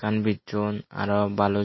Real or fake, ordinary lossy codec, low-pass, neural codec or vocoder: real; MP3, 24 kbps; 7.2 kHz; none